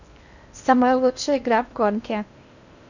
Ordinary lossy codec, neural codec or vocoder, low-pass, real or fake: none; codec, 16 kHz in and 24 kHz out, 0.6 kbps, FocalCodec, streaming, 2048 codes; 7.2 kHz; fake